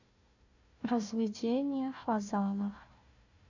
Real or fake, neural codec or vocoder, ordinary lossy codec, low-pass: fake; codec, 16 kHz, 1 kbps, FunCodec, trained on Chinese and English, 50 frames a second; none; 7.2 kHz